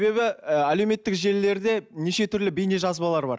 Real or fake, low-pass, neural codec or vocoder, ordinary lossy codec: real; none; none; none